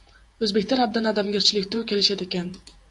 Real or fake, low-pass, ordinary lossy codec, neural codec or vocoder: fake; 10.8 kHz; AAC, 64 kbps; vocoder, 48 kHz, 128 mel bands, Vocos